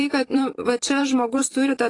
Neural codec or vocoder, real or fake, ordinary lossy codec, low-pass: vocoder, 44.1 kHz, 128 mel bands every 512 samples, BigVGAN v2; fake; AAC, 32 kbps; 10.8 kHz